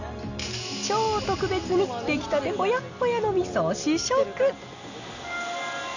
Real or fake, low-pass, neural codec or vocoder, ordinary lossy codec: real; 7.2 kHz; none; none